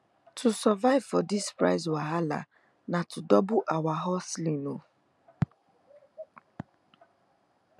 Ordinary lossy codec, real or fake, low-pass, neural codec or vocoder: none; real; none; none